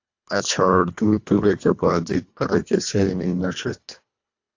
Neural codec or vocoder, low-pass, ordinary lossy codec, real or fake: codec, 24 kHz, 1.5 kbps, HILCodec; 7.2 kHz; AAC, 48 kbps; fake